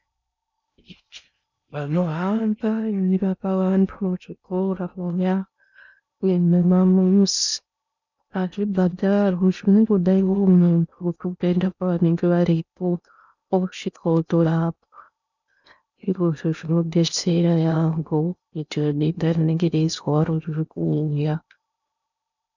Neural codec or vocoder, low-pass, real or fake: codec, 16 kHz in and 24 kHz out, 0.6 kbps, FocalCodec, streaming, 4096 codes; 7.2 kHz; fake